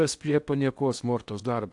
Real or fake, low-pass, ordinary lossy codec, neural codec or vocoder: fake; 10.8 kHz; AAC, 64 kbps; codec, 16 kHz in and 24 kHz out, 0.8 kbps, FocalCodec, streaming, 65536 codes